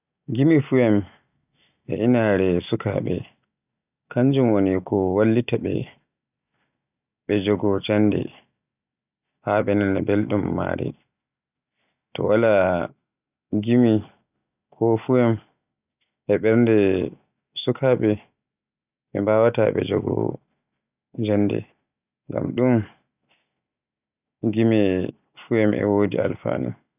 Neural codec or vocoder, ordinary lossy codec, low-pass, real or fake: none; none; 3.6 kHz; real